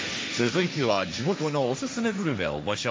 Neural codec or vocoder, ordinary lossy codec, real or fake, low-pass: codec, 16 kHz, 1.1 kbps, Voila-Tokenizer; none; fake; none